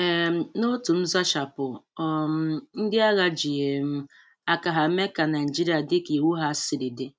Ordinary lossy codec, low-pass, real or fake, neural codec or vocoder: none; none; real; none